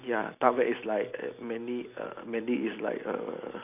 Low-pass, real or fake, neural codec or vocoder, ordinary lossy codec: 3.6 kHz; real; none; none